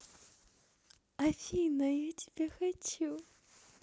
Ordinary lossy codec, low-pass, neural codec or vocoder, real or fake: none; none; none; real